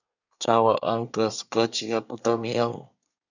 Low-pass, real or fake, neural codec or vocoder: 7.2 kHz; fake; codec, 24 kHz, 1 kbps, SNAC